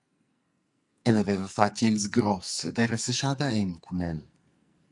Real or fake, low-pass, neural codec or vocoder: fake; 10.8 kHz; codec, 44.1 kHz, 2.6 kbps, SNAC